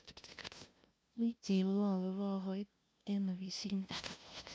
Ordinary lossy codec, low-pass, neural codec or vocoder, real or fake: none; none; codec, 16 kHz, 0.5 kbps, FunCodec, trained on LibriTTS, 25 frames a second; fake